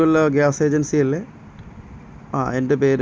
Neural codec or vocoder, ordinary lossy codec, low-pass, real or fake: none; none; none; real